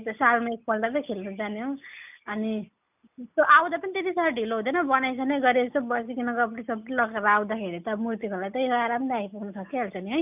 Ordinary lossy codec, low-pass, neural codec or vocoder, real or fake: none; 3.6 kHz; none; real